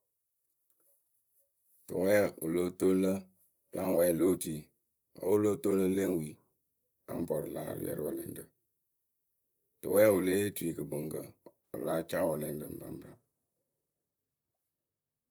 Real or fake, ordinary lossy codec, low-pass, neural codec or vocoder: fake; none; none; vocoder, 44.1 kHz, 128 mel bands, Pupu-Vocoder